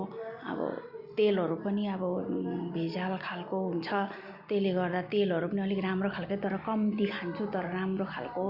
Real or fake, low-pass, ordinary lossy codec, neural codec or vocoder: real; 5.4 kHz; Opus, 64 kbps; none